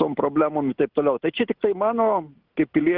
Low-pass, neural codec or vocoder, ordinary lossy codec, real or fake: 5.4 kHz; none; Opus, 24 kbps; real